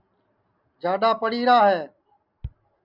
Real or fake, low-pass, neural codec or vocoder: real; 5.4 kHz; none